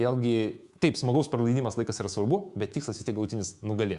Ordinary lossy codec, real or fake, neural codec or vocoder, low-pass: Opus, 64 kbps; fake; codec, 24 kHz, 3.1 kbps, DualCodec; 10.8 kHz